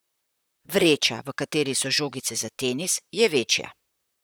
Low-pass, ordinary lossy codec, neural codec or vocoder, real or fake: none; none; vocoder, 44.1 kHz, 128 mel bands, Pupu-Vocoder; fake